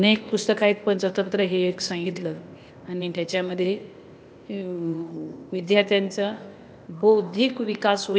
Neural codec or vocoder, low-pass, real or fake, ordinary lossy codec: codec, 16 kHz, 0.8 kbps, ZipCodec; none; fake; none